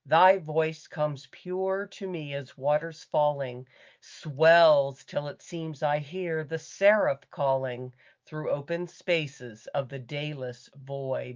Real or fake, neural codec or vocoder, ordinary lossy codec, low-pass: fake; autoencoder, 48 kHz, 128 numbers a frame, DAC-VAE, trained on Japanese speech; Opus, 32 kbps; 7.2 kHz